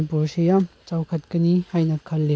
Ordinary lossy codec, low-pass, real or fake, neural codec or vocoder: none; none; real; none